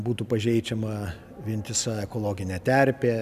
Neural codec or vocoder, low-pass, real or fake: none; 14.4 kHz; real